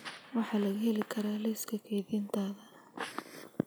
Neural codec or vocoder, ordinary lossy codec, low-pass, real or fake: none; none; none; real